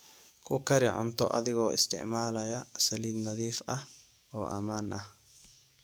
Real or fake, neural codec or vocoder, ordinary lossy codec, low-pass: fake; codec, 44.1 kHz, 7.8 kbps, DAC; none; none